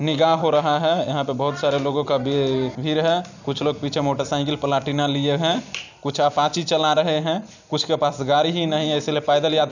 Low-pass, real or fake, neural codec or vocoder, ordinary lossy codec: 7.2 kHz; real; none; none